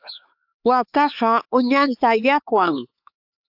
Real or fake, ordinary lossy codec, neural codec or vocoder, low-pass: fake; AAC, 48 kbps; codec, 16 kHz, 4 kbps, X-Codec, HuBERT features, trained on LibriSpeech; 5.4 kHz